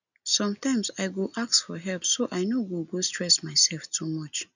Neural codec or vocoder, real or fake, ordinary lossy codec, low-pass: none; real; none; 7.2 kHz